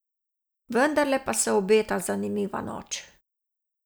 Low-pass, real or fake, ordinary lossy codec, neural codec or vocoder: none; real; none; none